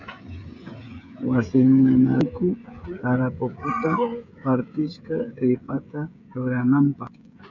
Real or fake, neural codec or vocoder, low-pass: fake; codec, 16 kHz, 16 kbps, FreqCodec, smaller model; 7.2 kHz